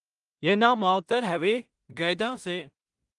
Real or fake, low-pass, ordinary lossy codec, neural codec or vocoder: fake; 10.8 kHz; Opus, 24 kbps; codec, 16 kHz in and 24 kHz out, 0.4 kbps, LongCat-Audio-Codec, two codebook decoder